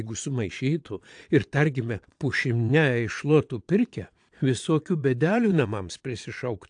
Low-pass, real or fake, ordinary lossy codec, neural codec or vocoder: 9.9 kHz; fake; AAC, 64 kbps; vocoder, 22.05 kHz, 80 mel bands, Vocos